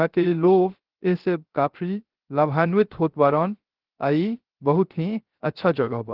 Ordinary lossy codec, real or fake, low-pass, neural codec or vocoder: Opus, 16 kbps; fake; 5.4 kHz; codec, 16 kHz, 0.3 kbps, FocalCodec